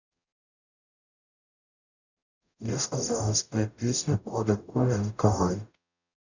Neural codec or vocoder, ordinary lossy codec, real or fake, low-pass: codec, 44.1 kHz, 0.9 kbps, DAC; none; fake; 7.2 kHz